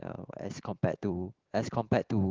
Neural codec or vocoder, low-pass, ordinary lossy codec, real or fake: none; 7.2 kHz; Opus, 16 kbps; real